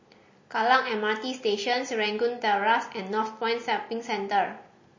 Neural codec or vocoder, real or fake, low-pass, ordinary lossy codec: none; real; 7.2 kHz; MP3, 32 kbps